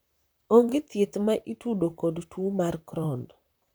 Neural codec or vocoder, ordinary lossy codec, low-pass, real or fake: vocoder, 44.1 kHz, 128 mel bands, Pupu-Vocoder; none; none; fake